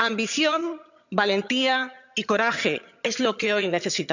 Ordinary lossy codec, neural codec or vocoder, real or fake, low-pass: none; vocoder, 22.05 kHz, 80 mel bands, HiFi-GAN; fake; 7.2 kHz